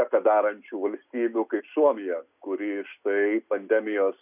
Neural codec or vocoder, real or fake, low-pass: none; real; 3.6 kHz